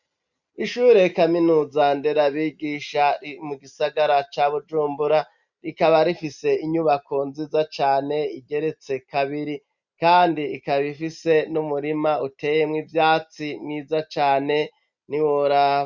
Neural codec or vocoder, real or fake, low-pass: none; real; 7.2 kHz